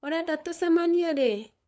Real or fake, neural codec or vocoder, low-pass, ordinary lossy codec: fake; codec, 16 kHz, 8 kbps, FunCodec, trained on LibriTTS, 25 frames a second; none; none